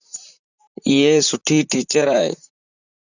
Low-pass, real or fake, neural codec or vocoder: 7.2 kHz; fake; vocoder, 44.1 kHz, 128 mel bands, Pupu-Vocoder